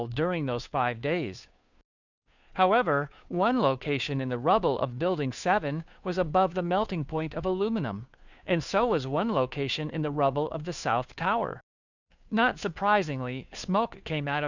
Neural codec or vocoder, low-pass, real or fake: codec, 16 kHz, 2 kbps, FunCodec, trained on Chinese and English, 25 frames a second; 7.2 kHz; fake